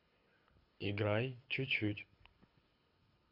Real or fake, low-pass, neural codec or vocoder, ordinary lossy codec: fake; 5.4 kHz; codec, 24 kHz, 6 kbps, HILCodec; AAC, 48 kbps